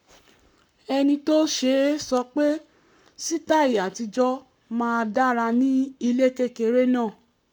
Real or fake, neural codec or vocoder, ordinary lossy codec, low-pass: fake; codec, 44.1 kHz, 7.8 kbps, Pupu-Codec; none; 19.8 kHz